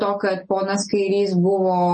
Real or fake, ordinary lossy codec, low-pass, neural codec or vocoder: real; MP3, 32 kbps; 10.8 kHz; none